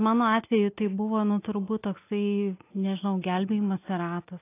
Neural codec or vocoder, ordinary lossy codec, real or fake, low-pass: none; AAC, 24 kbps; real; 3.6 kHz